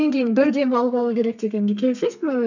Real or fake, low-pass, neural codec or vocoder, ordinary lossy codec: fake; 7.2 kHz; codec, 32 kHz, 1.9 kbps, SNAC; none